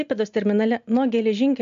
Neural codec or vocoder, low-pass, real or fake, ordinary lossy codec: none; 7.2 kHz; real; AAC, 64 kbps